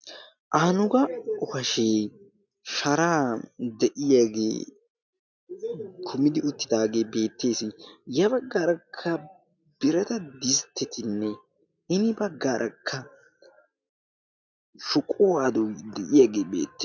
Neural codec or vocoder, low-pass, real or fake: none; 7.2 kHz; real